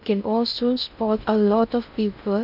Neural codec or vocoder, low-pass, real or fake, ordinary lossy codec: codec, 16 kHz in and 24 kHz out, 0.6 kbps, FocalCodec, streaming, 2048 codes; 5.4 kHz; fake; none